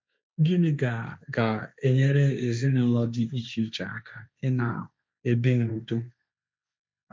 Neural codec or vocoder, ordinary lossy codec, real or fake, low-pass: codec, 16 kHz, 1.1 kbps, Voila-Tokenizer; none; fake; none